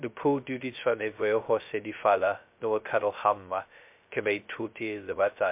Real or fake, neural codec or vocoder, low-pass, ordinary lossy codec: fake; codec, 16 kHz, 0.2 kbps, FocalCodec; 3.6 kHz; MP3, 32 kbps